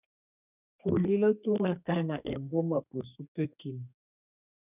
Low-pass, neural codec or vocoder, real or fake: 3.6 kHz; codec, 32 kHz, 1.9 kbps, SNAC; fake